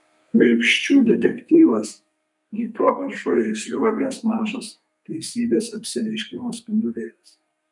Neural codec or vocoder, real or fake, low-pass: codec, 32 kHz, 1.9 kbps, SNAC; fake; 10.8 kHz